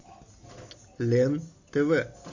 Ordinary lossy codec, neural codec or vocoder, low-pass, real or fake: AAC, 48 kbps; none; 7.2 kHz; real